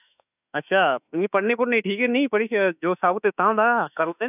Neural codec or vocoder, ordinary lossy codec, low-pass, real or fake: autoencoder, 48 kHz, 32 numbers a frame, DAC-VAE, trained on Japanese speech; none; 3.6 kHz; fake